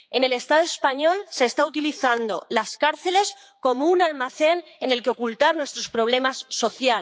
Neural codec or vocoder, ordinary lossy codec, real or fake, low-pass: codec, 16 kHz, 4 kbps, X-Codec, HuBERT features, trained on general audio; none; fake; none